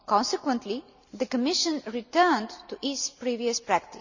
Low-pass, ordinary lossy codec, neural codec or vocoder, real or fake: 7.2 kHz; none; none; real